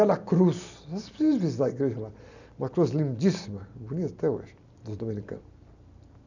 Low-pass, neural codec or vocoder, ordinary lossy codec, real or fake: 7.2 kHz; none; none; real